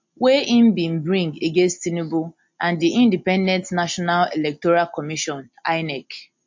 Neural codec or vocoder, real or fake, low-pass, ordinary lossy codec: none; real; 7.2 kHz; MP3, 48 kbps